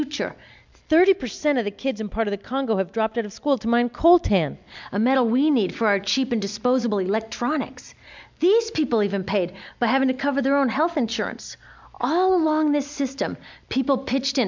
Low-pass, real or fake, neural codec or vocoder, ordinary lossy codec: 7.2 kHz; real; none; MP3, 64 kbps